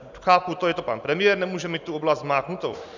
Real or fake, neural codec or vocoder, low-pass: real; none; 7.2 kHz